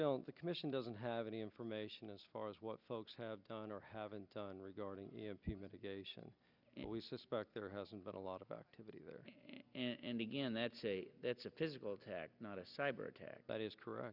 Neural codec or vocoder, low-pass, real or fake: none; 5.4 kHz; real